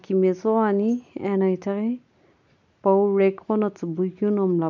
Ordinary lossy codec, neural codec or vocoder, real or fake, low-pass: none; none; real; 7.2 kHz